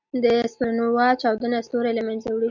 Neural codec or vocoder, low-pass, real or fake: none; 7.2 kHz; real